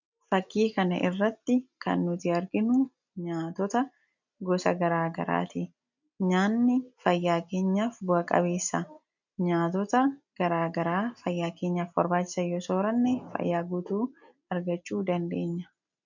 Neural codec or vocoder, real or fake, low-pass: none; real; 7.2 kHz